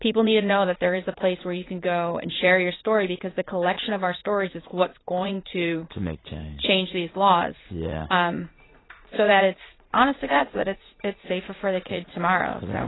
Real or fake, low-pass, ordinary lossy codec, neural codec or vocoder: fake; 7.2 kHz; AAC, 16 kbps; vocoder, 44.1 kHz, 80 mel bands, Vocos